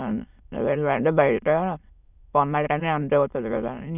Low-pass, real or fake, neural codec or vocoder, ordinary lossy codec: 3.6 kHz; fake; autoencoder, 22.05 kHz, a latent of 192 numbers a frame, VITS, trained on many speakers; none